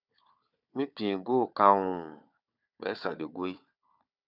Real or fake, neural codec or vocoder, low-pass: fake; codec, 16 kHz, 4 kbps, FunCodec, trained on Chinese and English, 50 frames a second; 5.4 kHz